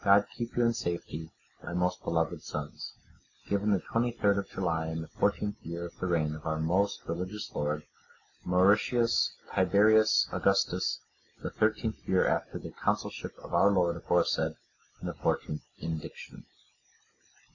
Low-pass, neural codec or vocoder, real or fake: 7.2 kHz; none; real